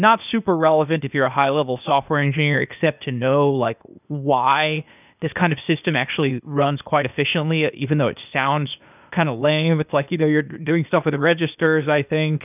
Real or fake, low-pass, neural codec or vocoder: fake; 3.6 kHz; codec, 16 kHz, 0.8 kbps, ZipCodec